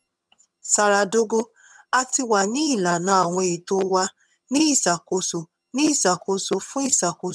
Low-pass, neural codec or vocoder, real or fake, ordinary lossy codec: none; vocoder, 22.05 kHz, 80 mel bands, HiFi-GAN; fake; none